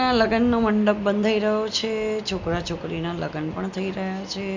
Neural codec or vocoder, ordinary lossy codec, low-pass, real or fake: none; MP3, 64 kbps; 7.2 kHz; real